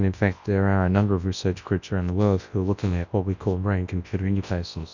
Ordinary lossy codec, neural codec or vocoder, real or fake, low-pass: Opus, 64 kbps; codec, 24 kHz, 0.9 kbps, WavTokenizer, large speech release; fake; 7.2 kHz